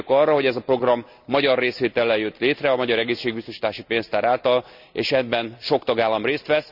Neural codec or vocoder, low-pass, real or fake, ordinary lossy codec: none; 5.4 kHz; real; none